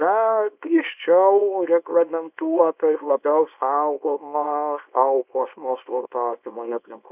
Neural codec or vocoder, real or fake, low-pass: codec, 24 kHz, 0.9 kbps, WavTokenizer, small release; fake; 3.6 kHz